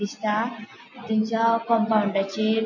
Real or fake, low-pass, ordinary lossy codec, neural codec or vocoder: real; 7.2 kHz; MP3, 48 kbps; none